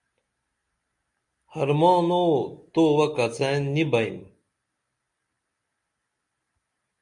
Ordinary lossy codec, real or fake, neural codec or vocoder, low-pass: MP3, 64 kbps; real; none; 10.8 kHz